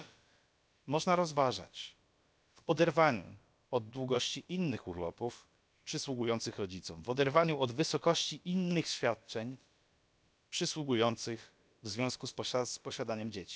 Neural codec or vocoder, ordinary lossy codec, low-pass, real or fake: codec, 16 kHz, about 1 kbps, DyCAST, with the encoder's durations; none; none; fake